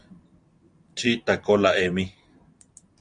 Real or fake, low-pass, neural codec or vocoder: real; 9.9 kHz; none